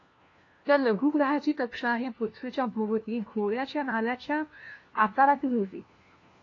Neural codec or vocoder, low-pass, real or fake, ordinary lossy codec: codec, 16 kHz, 1 kbps, FunCodec, trained on LibriTTS, 50 frames a second; 7.2 kHz; fake; AAC, 32 kbps